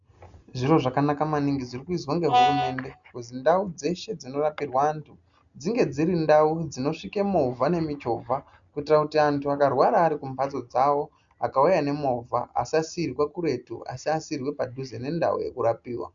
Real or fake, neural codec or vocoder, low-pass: real; none; 7.2 kHz